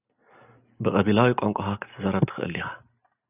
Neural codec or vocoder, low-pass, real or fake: none; 3.6 kHz; real